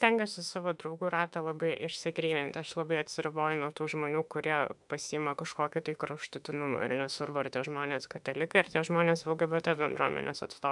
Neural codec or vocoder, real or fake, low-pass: autoencoder, 48 kHz, 32 numbers a frame, DAC-VAE, trained on Japanese speech; fake; 10.8 kHz